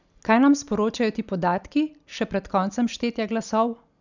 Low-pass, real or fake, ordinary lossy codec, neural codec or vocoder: 7.2 kHz; real; none; none